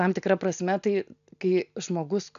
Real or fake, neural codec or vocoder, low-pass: real; none; 7.2 kHz